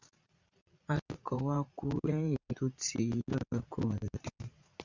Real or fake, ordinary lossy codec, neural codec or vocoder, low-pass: fake; Opus, 64 kbps; vocoder, 44.1 kHz, 80 mel bands, Vocos; 7.2 kHz